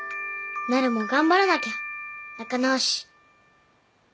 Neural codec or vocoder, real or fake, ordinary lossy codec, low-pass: none; real; none; none